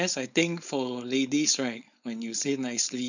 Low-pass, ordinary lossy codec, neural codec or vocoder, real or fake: 7.2 kHz; none; codec, 16 kHz, 4.8 kbps, FACodec; fake